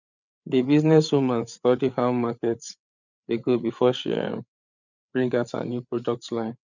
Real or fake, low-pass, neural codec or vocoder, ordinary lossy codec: fake; 7.2 kHz; codec, 16 kHz, 8 kbps, FreqCodec, larger model; none